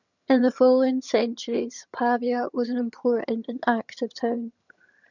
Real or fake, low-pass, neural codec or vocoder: fake; 7.2 kHz; vocoder, 22.05 kHz, 80 mel bands, HiFi-GAN